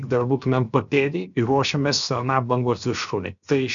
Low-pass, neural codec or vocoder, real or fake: 7.2 kHz; codec, 16 kHz, 0.7 kbps, FocalCodec; fake